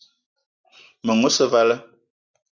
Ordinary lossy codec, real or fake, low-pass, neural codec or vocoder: Opus, 64 kbps; real; 7.2 kHz; none